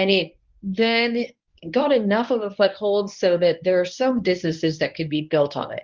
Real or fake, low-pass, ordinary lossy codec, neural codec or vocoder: fake; 7.2 kHz; Opus, 32 kbps; codec, 24 kHz, 0.9 kbps, WavTokenizer, medium speech release version 1